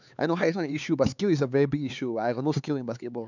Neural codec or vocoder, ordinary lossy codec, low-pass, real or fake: codec, 16 kHz, 4 kbps, X-Codec, HuBERT features, trained on LibriSpeech; none; 7.2 kHz; fake